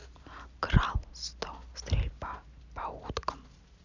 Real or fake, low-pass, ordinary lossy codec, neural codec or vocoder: real; 7.2 kHz; none; none